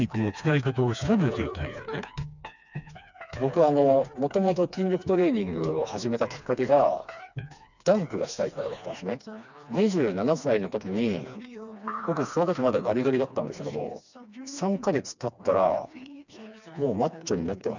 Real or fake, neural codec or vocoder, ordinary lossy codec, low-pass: fake; codec, 16 kHz, 2 kbps, FreqCodec, smaller model; none; 7.2 kHz